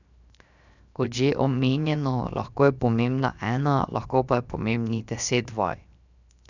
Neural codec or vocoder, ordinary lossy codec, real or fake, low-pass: codec, 16 kHz, 0.7 kbps, FocalCodec; none; fake; 7.2 kHz